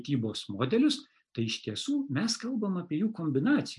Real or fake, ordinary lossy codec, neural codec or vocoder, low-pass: real; MP3, 96 kbps; none; 10.8 kHz